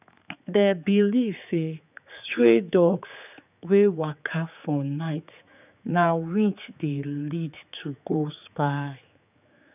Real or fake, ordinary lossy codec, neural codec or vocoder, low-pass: fake; none; codec, 16 kHz, 4 kbps, X-Codec, HuBERT features, trained on general audio; 3.6 kHz